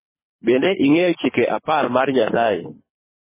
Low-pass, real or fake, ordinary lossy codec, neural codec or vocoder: 3.6 kHz; fake; MP3, 16 kbps; codec, 24 kHz, 3 kbps, HILCodec